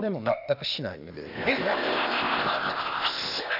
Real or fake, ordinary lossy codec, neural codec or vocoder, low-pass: fake; none; codec, 16 kHz, 0.8 kbps, ZipCodec; 5.4 kHz